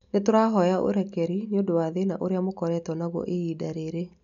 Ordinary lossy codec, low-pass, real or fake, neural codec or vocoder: none; 7.2 kHz; real; none